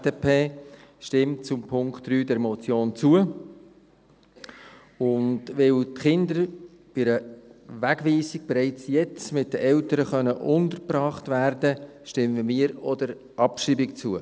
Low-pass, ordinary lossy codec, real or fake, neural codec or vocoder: none; none; real; none